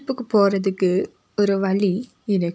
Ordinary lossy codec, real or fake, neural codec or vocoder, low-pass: none; real; none; none